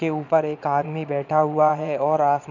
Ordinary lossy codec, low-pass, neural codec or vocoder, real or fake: none; 7.2 kHz; vocoder, 22.05 kHz, 80 mel bands, Vocos; fake